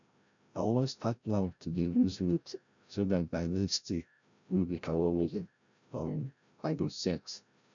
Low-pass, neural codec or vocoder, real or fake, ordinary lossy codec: 7.2 kHz; codec, 16 kHz, 0.5 kbps, FreqCodec, larger model; fake; none